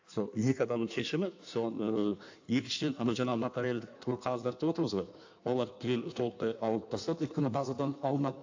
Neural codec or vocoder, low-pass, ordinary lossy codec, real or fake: codec, 16 kHz in and 24 kHz out, 1.1 kbps, FireRedTTS-2 codec; 7.2 kHz; AAC, 48 kbps; fake